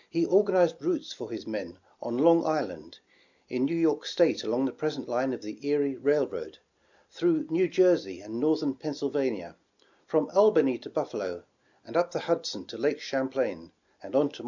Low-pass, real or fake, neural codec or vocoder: 7.2 kHz; real; none